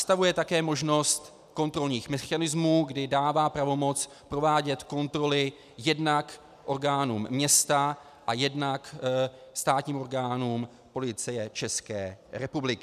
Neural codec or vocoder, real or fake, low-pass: none; real; 14.4 kHz